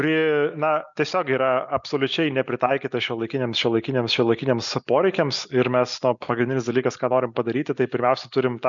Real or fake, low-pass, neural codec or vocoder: real; 7.2 kHz; none